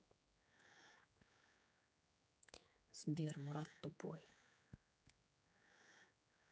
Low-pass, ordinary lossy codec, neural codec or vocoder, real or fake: none; none; codec, 16 kHz, 4 kbps, X-Codec, HuBERT features, trained on general audio; fake